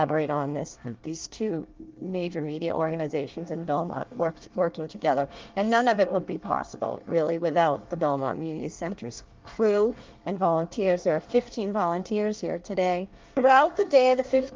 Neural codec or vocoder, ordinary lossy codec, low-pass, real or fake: codec, 24 kHz, 1 kbps, SNAC; Opus, 32 kbps; 7.2 kHz; fake